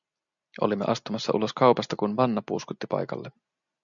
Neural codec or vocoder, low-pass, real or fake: none; 7.2 kHz; real